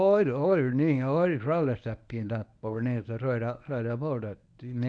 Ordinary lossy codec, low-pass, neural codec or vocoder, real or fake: none; 9.9 kHz; codec, 24 kHz, 0.9 kbps, WavTokenizer, medium speech release version 1; fake